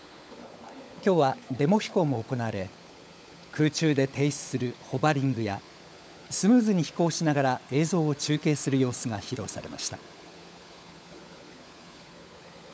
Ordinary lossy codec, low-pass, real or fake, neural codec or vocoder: none; none; fake; codec, 16 kHz, 16 kbps, FunCodec, trained on LibriTTS, 50 frames a second